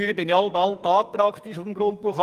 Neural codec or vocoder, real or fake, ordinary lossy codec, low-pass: codec, 44.1 kHz, 2.6 kbps, SNAC; fake; Opus, 32 kbps; 14.4 kHz